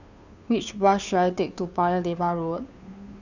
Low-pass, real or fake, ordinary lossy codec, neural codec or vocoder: 7.2 kHz; fake; none; codec, 16 kHz, 2 kbps, FunCodec, trained on Chinese and English, 25 frames a second